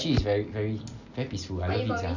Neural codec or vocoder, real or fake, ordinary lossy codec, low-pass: none; real; none; 7.2 kHz